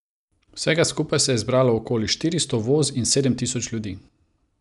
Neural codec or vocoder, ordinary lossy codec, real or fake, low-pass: none; none; real; 10.8 kHz